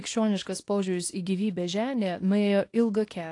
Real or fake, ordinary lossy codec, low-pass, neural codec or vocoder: fake; AAC, 48 kbps; 10.8 kHz; codec, 24 kHz, 0.9 kbps, WavTokenizer, small release